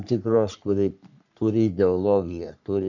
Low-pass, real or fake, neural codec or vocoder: 7.2 kHz; fake; codec, 44.1 kHz, 3.4 kbps, Pupu-Codec